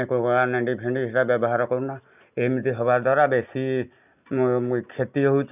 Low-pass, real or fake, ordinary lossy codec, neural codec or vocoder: 3.6 kHz; real; none; none